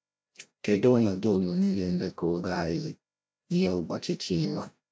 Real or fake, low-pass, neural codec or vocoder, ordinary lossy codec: fake; none; codec, 16 kHz, 0.5 kbps, FreqCodec, larger model; none